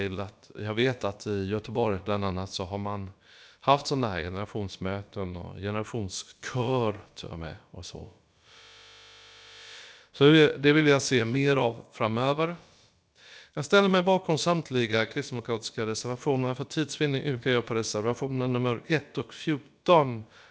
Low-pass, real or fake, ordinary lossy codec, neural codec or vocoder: none; fake; none; codec, 16 kHz, about 1 kbps, DyCAST, with the encoder's durations